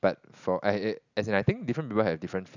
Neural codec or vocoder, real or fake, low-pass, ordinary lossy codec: none; real; 7.2 kHz; none